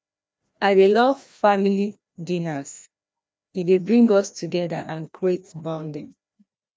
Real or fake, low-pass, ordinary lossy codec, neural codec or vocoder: fake; none; none; codec, 16 kHz, 1 kbps, FreqCodec, larger model